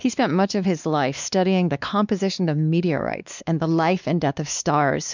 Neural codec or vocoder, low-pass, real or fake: codec, 16 kHz, 2 kbps, X-Codec, WavLM features, trained on Multilingual LibriSpeech; 7.2 kHz; fake